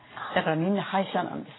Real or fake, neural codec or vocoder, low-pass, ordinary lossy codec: real; none; 7.2 kHz; AAC, 16 kbps